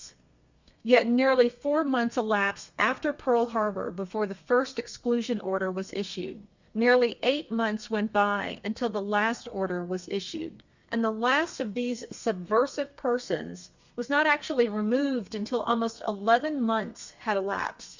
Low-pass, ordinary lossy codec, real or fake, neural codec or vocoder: 7.2 kHz; Opus, 64 kbps; fake; codec, 32 kHz, 1.9 kbps, SNAC